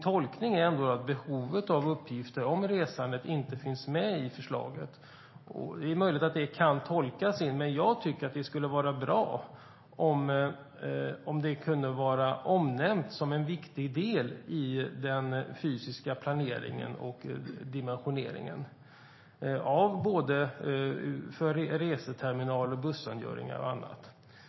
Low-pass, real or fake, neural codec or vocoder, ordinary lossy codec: 7.2 kHz; real; none; MP3, 24 kbps